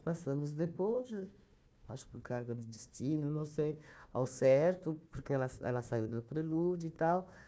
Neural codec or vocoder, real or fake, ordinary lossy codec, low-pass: codec, 16 kHz, 1 kbps, FunCodec, trained on Chinese and English, 50 frames a second; fake; none; none